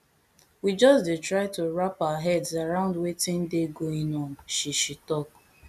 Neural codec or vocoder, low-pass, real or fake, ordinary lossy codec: none; 14.4 kHz; real; none